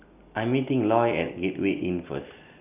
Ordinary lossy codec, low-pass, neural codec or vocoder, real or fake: none; 3.6 kHz; none; real